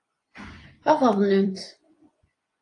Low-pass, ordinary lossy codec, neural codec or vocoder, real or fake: 10.8 kHz; AAC, 48 kbps; vocoder, 24 kHz, 100 mel bands, Vocos; fake